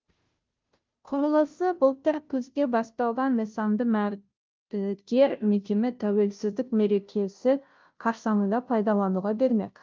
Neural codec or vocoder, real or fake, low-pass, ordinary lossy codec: codec, 16 kHz, 0.5 kbps, FunCodec, trained on Chinese and English, 25 frames a second; fake; 7.2 kHz; Opus, 24 kbps